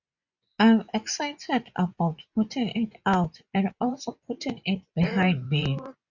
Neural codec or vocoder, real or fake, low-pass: vocoder, 24 kHz, 100 mel bands, Vocos; fake; 7.2 kHz